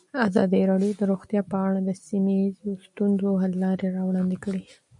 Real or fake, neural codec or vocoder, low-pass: real; none; 10.8 kHz